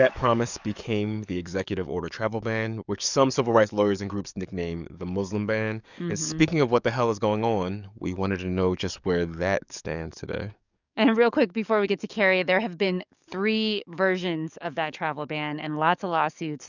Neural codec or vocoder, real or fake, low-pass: codec, 44.1 kHz, 7.8 kbps, DAC; fake; 7.2 kHz